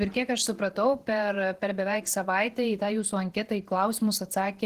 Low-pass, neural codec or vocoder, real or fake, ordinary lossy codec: 14.4 kHz; vocoder, 48 kHz, 128 mel bands, Vocos; fake; Opus, 16 kbps